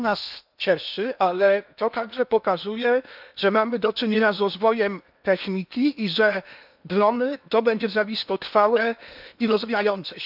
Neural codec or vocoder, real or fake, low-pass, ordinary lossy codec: codec, 16 kHz in and 24 kHz out, 0.8 kbps, FocalCodec, streaming, 65536 codes; fake; 5.4 kHz; none